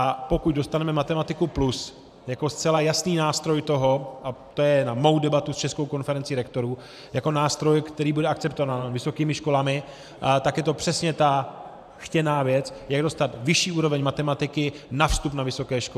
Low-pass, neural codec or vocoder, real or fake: 14.4 kHz; vocoder, 44.1 kHz, 128 mel bands every 512 samples, BigVGAN v2; fake